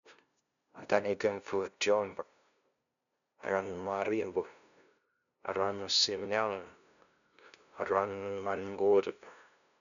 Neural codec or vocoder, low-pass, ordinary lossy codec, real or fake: codec, 16 kHz, 0.5 kbps, FunCodec, trained on LibriTTS, 25 frames a second; 7.2 kHz; none; fake